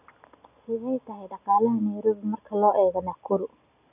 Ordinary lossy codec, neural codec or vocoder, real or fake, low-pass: none; none; real; 3.6 kHz